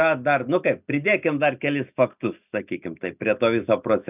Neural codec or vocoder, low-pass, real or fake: none; 3.6 kHz; real